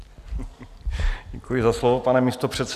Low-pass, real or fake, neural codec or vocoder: 14.4 kHz; real; none